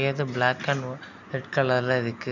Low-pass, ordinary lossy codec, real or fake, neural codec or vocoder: 7.2 kHz; none; real; none